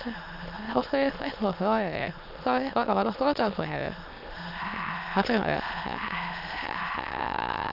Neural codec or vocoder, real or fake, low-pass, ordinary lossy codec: autoencoder, 22.05 kHz, a latent of 192 numbers a frame, VITS, trained on many speakers; fake; 5.4 kHz; none